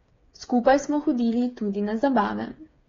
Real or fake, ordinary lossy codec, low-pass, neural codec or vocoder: fake; AAC, 32 kbps; 7.2 kHz; codec, 16 kHz, 8 kbps, FreqCodec, smaller model